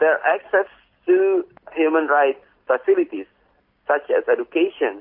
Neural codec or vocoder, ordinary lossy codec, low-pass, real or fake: none; MP3, 32 kbps; 5.4 kHz; real